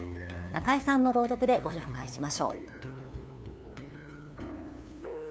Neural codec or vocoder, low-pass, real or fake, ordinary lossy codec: codec, 16 kHz, 2 kbps, FunCodec, trained on LibriTTS, 25 frames a second; none; fake; none